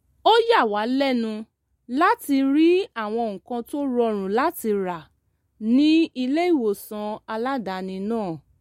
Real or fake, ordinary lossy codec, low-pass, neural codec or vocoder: real; MP3, 64 kbps; 19.8 kHz; none